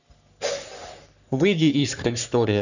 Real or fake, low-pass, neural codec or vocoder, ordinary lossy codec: fake; 7.2 kHz; codec, 44.1 kHz, 3.4 kbps, Pupu-Codec; none